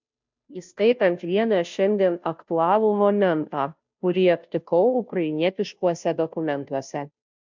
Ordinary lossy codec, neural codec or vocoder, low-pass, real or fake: MP3, 64 kbps; codec, 16 kHz, 0.5 kbps, FunCodec, trained on Chinese and English, 25 frames a second; 7.2 kHz; fake